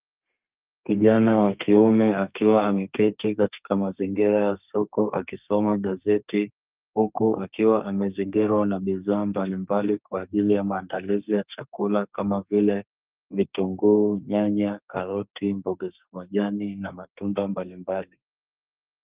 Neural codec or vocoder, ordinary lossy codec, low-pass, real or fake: codec, 32 kHz, 1.9 kbps, SNAC; Opus, 24 kbps; 3.6 kHz; fake